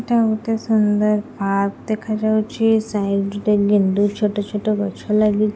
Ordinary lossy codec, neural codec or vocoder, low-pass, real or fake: none; none; none; real